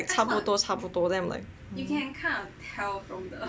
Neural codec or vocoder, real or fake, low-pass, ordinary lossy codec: none; real; none; none